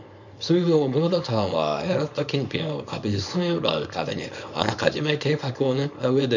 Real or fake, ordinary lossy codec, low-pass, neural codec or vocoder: fake; none; 7.2 kHz; codec, 24 kHz, 0.9 kbps, WavTokenizer, small release